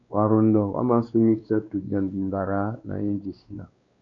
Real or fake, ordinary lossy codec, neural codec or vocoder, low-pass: fake; AAC, 48 kbps; codec, 16 kHz, 2 kbps, X-Codec, WavLM features, trained on Multilingual LibriSpeech; 7.2 kHz